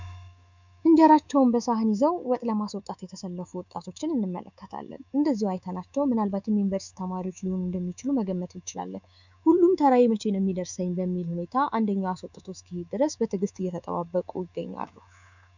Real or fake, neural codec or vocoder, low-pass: fake; codec, 24 kHz, 3.1 kbps, DualCodec; 7.2 kHz